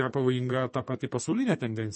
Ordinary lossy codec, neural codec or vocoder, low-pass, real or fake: MP3, 32 kbps; codec, 32 kHz, 1.9 kbps, SNAC; 10.8 kHz; fake